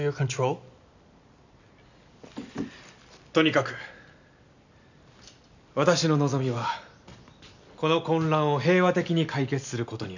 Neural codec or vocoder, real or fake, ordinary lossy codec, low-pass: none; real; none; 7.2 kHz